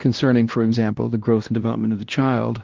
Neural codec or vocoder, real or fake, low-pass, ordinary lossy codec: codec, 16 kHz, 1 kbps, X-Codec, WavLM features, trained on Multilingual LibriSpeech; fake; 7.2 kHz; Opus, 16 kbps